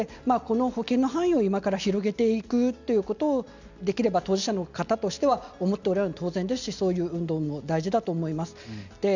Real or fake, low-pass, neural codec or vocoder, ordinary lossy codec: real; 7.2 kHz; none; none